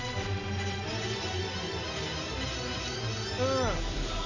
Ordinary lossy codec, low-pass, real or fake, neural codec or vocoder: none; 7.2 kHz; real; none